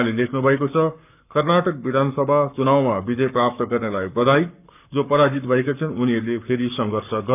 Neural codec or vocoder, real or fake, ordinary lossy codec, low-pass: codec, 44.1 kHz, 7.8 kbps, Pupu-Codec; fake; none; 3.6 kHz